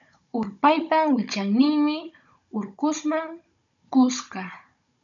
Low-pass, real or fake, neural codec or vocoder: 7.2 kHz; fake; codec, 16 kHz, 16 kbps, FunCodec, trained on Chinese and English, 50 frames a second